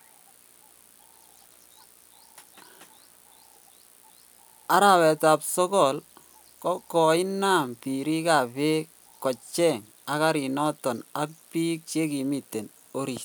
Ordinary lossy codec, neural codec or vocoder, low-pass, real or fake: none; none; none; real